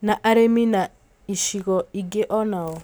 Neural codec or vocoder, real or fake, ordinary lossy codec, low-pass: none; real; none; none